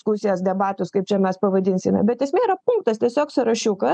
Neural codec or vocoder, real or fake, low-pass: none; real; 9.9 kHz